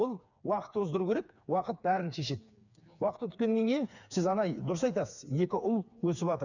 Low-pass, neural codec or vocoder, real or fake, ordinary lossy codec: 7.2 kHz; codec, 16 kHz, 4 kbps, FreqCodec, smaller model; fake; none